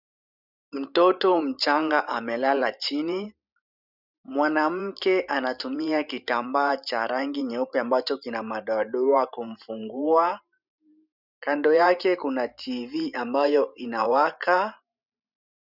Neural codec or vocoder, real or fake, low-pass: vocoder, 44.1 kHz, 128 mel bands every 512 samples, BigVGAN v2; fake; 5.4 kHz